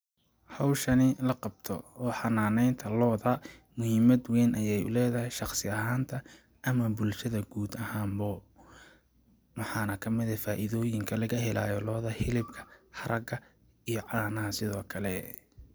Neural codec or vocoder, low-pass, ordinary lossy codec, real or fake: none; none; none; real